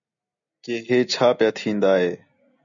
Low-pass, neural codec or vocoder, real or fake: 7.2 kHz; none; real